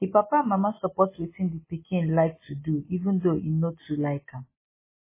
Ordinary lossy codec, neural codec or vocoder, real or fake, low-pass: MP3, 16 kbps; none; real; 3.6 kHz